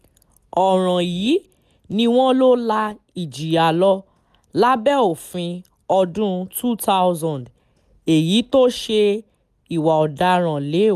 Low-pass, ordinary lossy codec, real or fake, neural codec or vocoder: 14.4 kHz; none; real; none